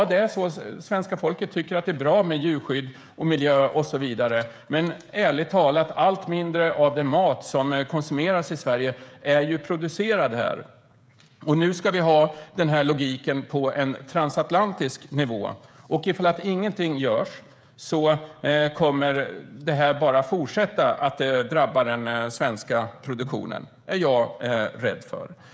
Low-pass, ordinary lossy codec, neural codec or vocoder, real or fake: none; none; codec, 16 kHz, 16 kbps, FreqCodec, smaller model; fake